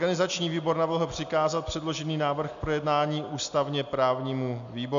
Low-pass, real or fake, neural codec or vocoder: 7.2 kHz; real; none